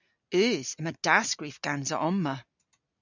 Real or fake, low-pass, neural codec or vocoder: real; 7.2 kHz; none